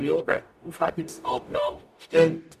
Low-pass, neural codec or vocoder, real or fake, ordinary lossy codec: 14.4 kHz; codec, 44.1 kHz, 0.9 kbps, DAC; fake; none